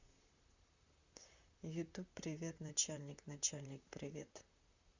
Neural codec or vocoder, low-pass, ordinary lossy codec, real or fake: vocoder, 44.1 kHz, 128 mel bands, Pupu-Vocoder; 7.2 kHz; Opus, 64 kbps; fake